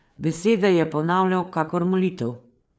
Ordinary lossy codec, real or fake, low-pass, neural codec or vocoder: none; fake; none; codec, 16 kHz, 4 kbps, FreqCodec, larger model